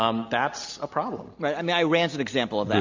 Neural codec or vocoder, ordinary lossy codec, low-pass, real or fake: none; MP3, 48 kbps; 7.2 kHz; real